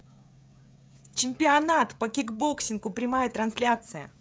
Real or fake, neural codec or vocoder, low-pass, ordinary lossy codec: fake; codec, 16 kHz, 16 kbps, FreqCodec, smaller model; none; none